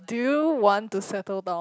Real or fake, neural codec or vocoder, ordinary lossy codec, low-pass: real; none; none; none